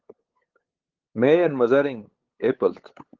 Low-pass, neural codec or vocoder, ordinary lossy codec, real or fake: 7.2 kHz; codec, 16 kHz, 8 kbps, FunCodec, trained on LibriTTS, 25 frames a second; Opus, 16 kbps; fake